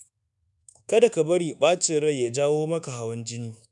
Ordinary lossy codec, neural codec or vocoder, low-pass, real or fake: none; codec, 24 kHz, 1.2 kbps, DualCodec; 10.8 kHz; fake